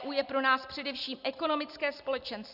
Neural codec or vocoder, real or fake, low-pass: none; real; 5.4 kHz